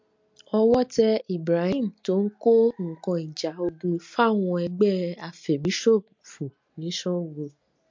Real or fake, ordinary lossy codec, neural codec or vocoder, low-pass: real; MP3, 48 kbps; none; 7.2 kHz